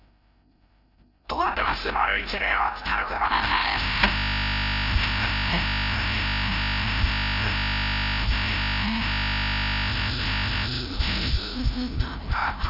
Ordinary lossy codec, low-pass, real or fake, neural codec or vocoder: none; 5.4 kHz; fake; codec, 16 kHz, 0.5 kbps, FreqCodec, larger model